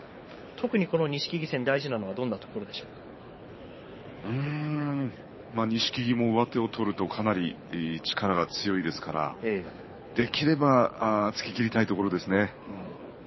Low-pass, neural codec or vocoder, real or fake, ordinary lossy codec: 7.2 kHz; codec, 24 kHz, 6 kbps, HILCodec; fake; MP3, 24 kbps